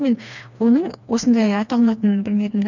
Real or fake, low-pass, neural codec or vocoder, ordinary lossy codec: fake; 7.2 kHz; codec, 16 kHz, 2 kbps, FreqCodec, smaller model; none